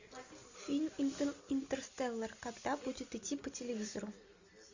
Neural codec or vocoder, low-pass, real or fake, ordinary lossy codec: none; 7.2 kHz; real; Opus, 64 kbps